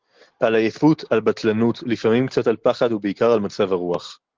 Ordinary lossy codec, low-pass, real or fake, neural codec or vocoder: Opus, 16 kbps; 7.2 kHz; real; none